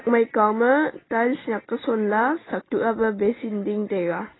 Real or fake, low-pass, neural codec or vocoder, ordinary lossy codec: real; 7.2 kHz; none; AAC, 16 kbps